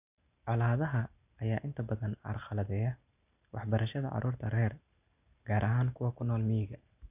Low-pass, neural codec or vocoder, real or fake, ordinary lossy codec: 3.6 kHz; none; real; none